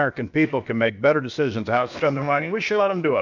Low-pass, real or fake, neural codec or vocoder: 7.2 kHz; fake; codec, 16 kHz, about 1 kbps, DyCAST, with the encoder's durations